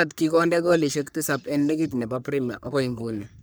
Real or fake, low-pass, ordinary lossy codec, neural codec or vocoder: fake; none; none; codec, 44.1 kHz, 3.4 kbps, Pupu-Codec